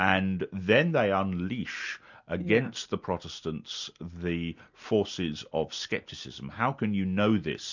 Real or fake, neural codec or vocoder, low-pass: real; none; 7.2 kHz